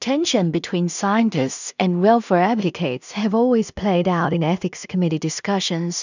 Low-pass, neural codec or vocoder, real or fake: 7.2 kHz; codec, 16 kHz in and 24 kHz out, 0.4 kbps, LongCat-Audio-Codec, two codebook decoder; fake